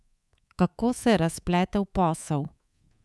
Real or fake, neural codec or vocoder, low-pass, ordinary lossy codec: fake; codec, 24 kHz, 3.1 kbps, DualCodec; none; none